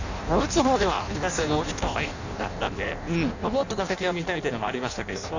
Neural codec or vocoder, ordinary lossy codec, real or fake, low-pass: codec, 16 kHz in and 24 kHz out, 0.6 kbps, FireRedTTS-2 codec; none; fake; 7.2 kHz